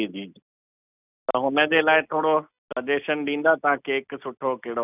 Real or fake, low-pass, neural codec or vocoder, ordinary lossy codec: real; 3.6 kHz; none; none